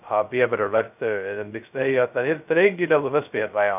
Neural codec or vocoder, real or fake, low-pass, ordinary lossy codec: codec, 16 kHz, 0.2 kbps, FocalCodec; fake; 3.6 kHz; none